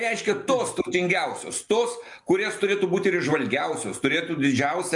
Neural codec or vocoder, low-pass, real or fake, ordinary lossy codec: none; 10.8 kHz; real; MP3, 64 kbps